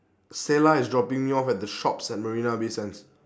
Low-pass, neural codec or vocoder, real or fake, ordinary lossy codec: none; none; real; none